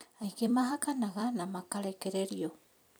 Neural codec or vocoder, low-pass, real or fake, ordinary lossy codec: vocoder, 44.1 kHz, 128 mel bands every 256 samples, BigVGAN v2; none; fake; none